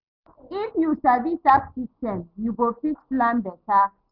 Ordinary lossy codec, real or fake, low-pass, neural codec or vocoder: none; real; 5.4 kHz; none